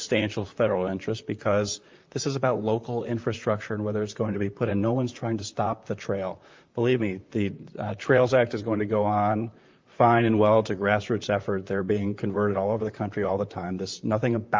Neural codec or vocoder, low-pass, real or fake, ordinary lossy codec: vocoder, 44.1 kHz, 128 mel bands, Pupu-Vocoder; 7.2 kHz; fake; Opus, 24 kbps